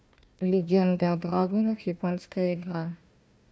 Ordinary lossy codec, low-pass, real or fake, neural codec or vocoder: none; none; fake; codec, 16 kHz, 1 kbps, FunCodec, trained on Chinese and English, 50 frames a second